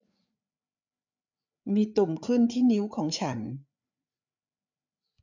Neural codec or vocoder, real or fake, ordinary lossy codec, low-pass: codec, 16 kHz, 8 kbps, FreqCodec, larger model; fake; none; 7.2 kHz